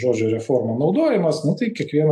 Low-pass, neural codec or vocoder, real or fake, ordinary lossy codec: 14.4 kHz; none; real; AAC, 64 kbps